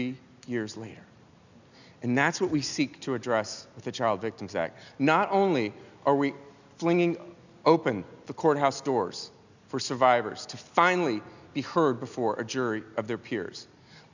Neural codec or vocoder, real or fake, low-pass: none; real; 7.2 kHz